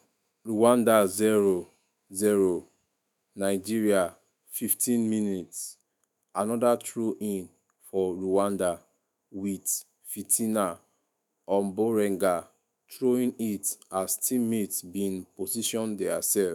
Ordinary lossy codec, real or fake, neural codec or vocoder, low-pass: none; fake; autoencoder, 48 kHz, 128 numbers a frame, DAC-VAE, trained on Japanese speech; none